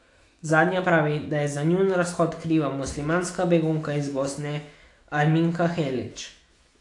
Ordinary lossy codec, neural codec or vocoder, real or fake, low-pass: AAC, 48 kbps; autoencoder, 48 kHz, 128 numbers a frame, DAC-VAE, trained on Japanese speech; fake; 10.8 kHz